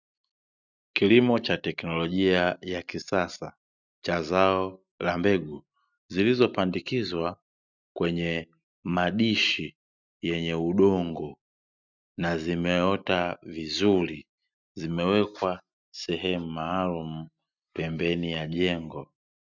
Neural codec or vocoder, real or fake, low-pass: none; real; 7.2 kHz